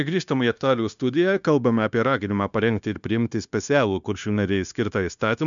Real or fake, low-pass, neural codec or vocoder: fake; 7.2 kHz; codec, 16 kHz, 0.9 kbps, LongCat-Audio-Codec